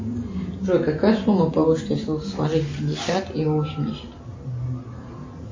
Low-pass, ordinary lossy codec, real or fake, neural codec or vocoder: 7.2 kHz; MP3, 32 kbps; real; none